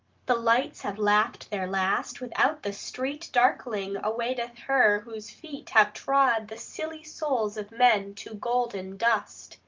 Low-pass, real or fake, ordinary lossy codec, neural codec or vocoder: 7.2 kHz; real; Opus, 32 kbps; none